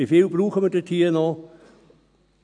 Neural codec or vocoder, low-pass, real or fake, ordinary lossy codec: none; 9.9 kHz; real; none